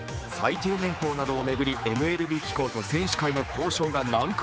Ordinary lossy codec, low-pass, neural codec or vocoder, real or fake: none; none; codec, 16 kHz, 4 kbps, X-Codec, HuBERT features, trained on general audio; fake